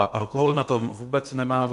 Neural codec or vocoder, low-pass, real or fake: codec, 16 kHz in and 24 kHz out, 0.8 kbps, FocalCodec, streaming, 65536 codes; 10.8 kHz; fake